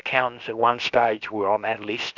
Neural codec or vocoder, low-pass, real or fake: codec, 16 kHz, about 1 kbps, DyCAST, with the encoder's durations; 7.2 kHz; fake